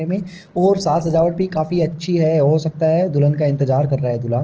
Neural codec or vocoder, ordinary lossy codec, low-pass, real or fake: none; Opus, 16 kbps; 7.2 kHz; real